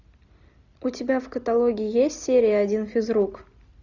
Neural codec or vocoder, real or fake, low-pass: none; real; 7.2 kHz